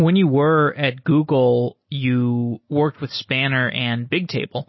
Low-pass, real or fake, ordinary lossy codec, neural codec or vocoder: 7.2 kHz; real; MP3, 24 kbps; none